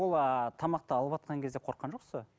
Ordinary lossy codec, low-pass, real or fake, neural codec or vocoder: none; none; real; none